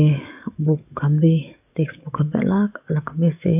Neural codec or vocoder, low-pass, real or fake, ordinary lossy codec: codec, 44.1 kHz, 7.8 kbps, DAC; 3.6 kHz; fake; none